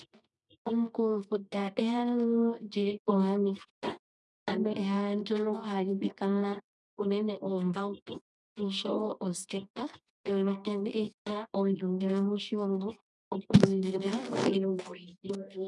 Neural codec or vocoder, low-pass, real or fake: codec, 24 kHz, 0.9 kbps, WavTokenizer, medium music audio release; 10.8 kHz; fake